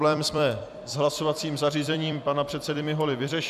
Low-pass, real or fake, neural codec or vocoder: 14.4 kHz; fake; vocoder, 44.1 kHz, 128 mel bands every 256 samples, BigVGAN v2